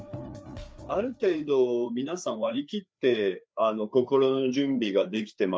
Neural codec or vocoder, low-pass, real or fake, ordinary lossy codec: codec, 16 kHz, 4 kbps, FreqCodec, larger model; none; fake; none